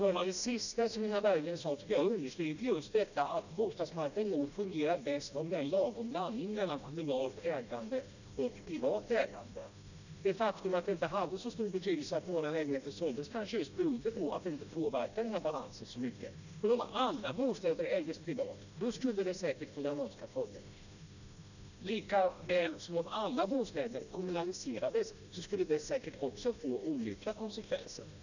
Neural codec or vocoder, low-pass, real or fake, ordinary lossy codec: codec, 16 kHz, 1 kbps, FreqCodec, smaller model; 7.2 kHz; fake; none